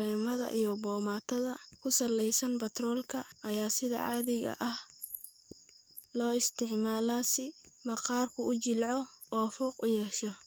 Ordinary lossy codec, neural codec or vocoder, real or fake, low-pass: none; codec, 44.1 kHz, 7.8 kbps, DAC; fake; none